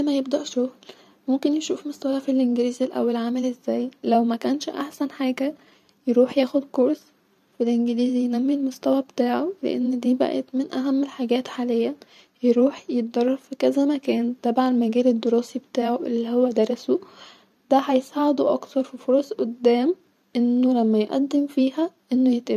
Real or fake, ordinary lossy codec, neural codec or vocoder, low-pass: fake; none; vocoder, 44.1 kHz, 128 mel bands every 512 samples, BigVGAN v2; 14.4 kHz